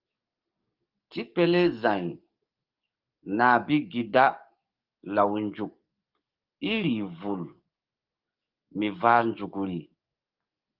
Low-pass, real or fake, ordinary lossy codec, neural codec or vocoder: 5.4 kHz; fake; Opus, 32 kbps; codec, 44.1 kHz, 7.8 kbps, DAC